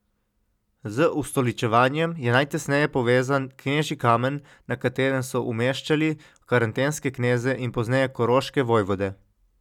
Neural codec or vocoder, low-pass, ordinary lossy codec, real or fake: none; 19.8 kHz; none; real